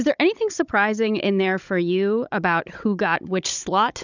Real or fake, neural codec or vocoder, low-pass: fake; codec, 16 kHz, 16 kbps, FunCodec, trained on Chinese and English, 50 frames a second; 7.2 kHz